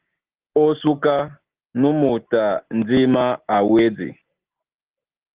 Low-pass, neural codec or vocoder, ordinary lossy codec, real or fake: 3.6 kHz; none; Opus, 16 kbps; real